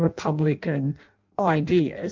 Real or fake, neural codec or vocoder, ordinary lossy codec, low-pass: fake; codec, 16 kHz in and 24 kHz out, 0.6 kbps, FireRedTTS-2 codec; Opus, 24 kbps; 7.2 kHz